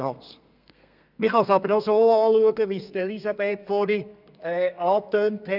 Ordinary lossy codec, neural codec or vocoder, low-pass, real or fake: none; codec, 32 kHz, 1.9 kbps, SNAC; 5.4 kHz; fake